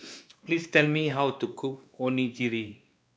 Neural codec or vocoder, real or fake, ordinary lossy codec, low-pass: codec, 16 kHz, 2 kbps, X-Codec, WavLM features, trained on Multilingual LibriSpeech; fake; none; none